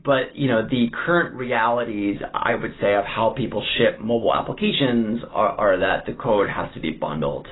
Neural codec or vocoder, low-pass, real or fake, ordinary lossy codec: none; 7.2 kHz; real; AAC, 16 kbps